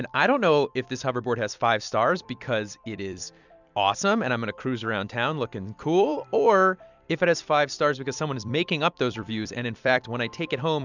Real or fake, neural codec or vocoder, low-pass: fake; vocoder, 44.1 kHz, 128 mel bands every 256 samples, BigVGAN v2; 7.2 kHz